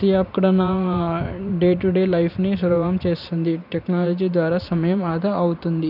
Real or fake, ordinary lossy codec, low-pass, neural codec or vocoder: fake; none; 5.4 kHz; vocoder, 44.1 kHz, 128 mel bands every 512 samples, BigVGAN v2